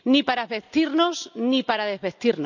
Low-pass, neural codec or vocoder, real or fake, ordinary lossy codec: 7.2 kHz; none; real; none